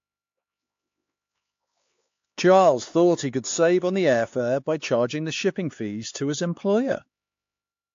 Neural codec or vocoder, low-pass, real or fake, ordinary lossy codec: codec, 16 kHz, 4 kbps, X-Codec, HuBERT features, trained on LibriSpeech; 7.2 kHz; fake; AAC, 48 kbps